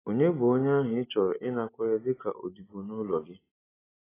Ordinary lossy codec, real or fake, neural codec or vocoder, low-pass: AAC, 16 kbps; real; none; 3.6 kHz